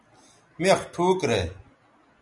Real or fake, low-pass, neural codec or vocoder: real; 10.8 kHz; none